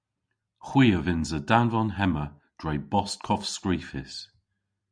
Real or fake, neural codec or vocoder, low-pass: real; none; 9.9 kHz